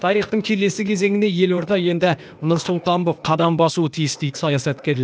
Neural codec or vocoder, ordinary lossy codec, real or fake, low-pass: codec, 16 kHz, 0.8 kbps, ZipCodec; none; fake; none